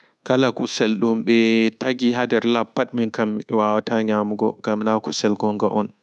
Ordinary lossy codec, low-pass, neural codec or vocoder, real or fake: none; none; codec, 24 kHz, 1.2 kbps, DualCodec; fake